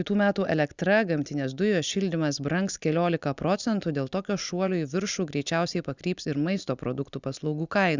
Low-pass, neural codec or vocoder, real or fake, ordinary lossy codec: 7.2 kHz; none; real; Opus, 64 kbps